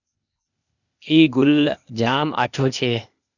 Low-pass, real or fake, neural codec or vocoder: 7.2 kHz; fake; codec, 16 kHz, 0.8 kbps, ZipCodec